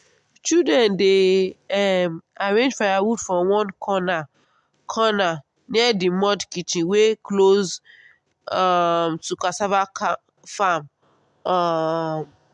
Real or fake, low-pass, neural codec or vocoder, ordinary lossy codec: real; 10.8 kHz; none; MP3, 64 kbps